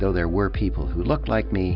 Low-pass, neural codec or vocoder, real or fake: 5.4 kHz; none; real